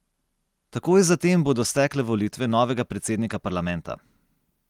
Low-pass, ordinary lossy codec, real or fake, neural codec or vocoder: 19.8 kHz; Opus, 24 kbps; real; none